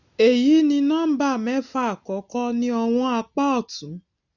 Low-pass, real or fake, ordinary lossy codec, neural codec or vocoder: 7.2 kHz; real; none; none